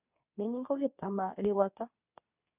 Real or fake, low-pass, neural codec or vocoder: fake; 3.6 kHz; codec, 24 kHz, 0.9 kbps, WavTokenizer, medium speech release version 2